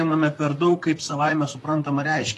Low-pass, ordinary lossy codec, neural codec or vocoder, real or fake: 14.4 kHz; AAC, 48 kbps; vocoder, 44.1 kHz, 128 mel bands, Pupu-Vocoder; fake